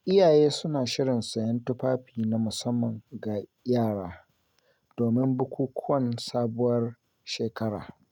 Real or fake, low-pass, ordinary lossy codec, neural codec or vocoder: real; 19.8 kHz; none; none